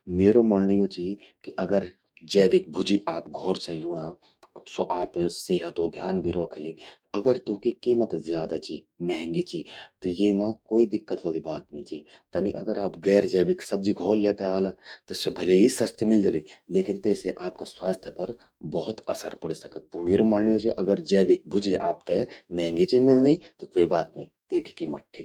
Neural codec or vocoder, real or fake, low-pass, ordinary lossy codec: codec, 44.1 kHz, 2.6 kbps, DAC; fake; 19.8 kHz; none